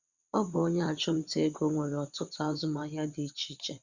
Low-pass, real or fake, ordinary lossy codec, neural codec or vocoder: 7.2 kHz; fake; Opus, 64 kbps; vocoder, 22.05 kHz, 80 mel bands, WaveNeXt